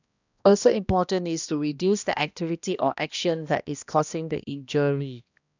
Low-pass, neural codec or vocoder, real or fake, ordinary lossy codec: 7.2 kHz; codec, 16 kHz, 1 kbps, X-Codec, HuBERT features, trained on balanced general audio; fake; none